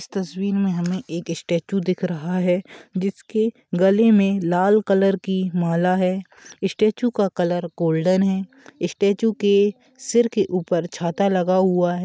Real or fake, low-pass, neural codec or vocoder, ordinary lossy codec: real; none; none; none